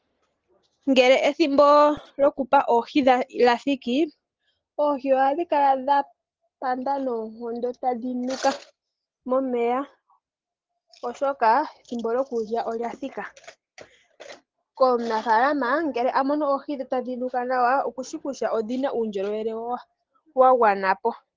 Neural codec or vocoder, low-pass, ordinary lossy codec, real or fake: none; 7.2 kHz; Opus, 16 kbps; real